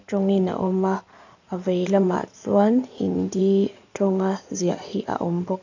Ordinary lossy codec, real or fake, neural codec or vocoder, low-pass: none; fake; codec, 16 kHz in and 24 kHz out, 2.2 kbps, FireRedTTS-2 codec; 7.2 kHz